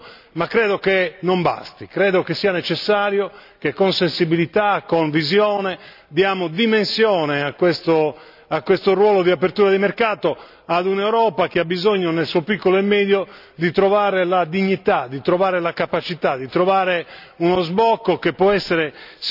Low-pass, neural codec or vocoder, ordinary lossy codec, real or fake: 5.4 kHz; none; none; real